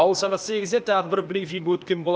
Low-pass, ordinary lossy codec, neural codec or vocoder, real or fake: none; none; codec, 16 kHz, 0.8 kbps, ZipCodec; fake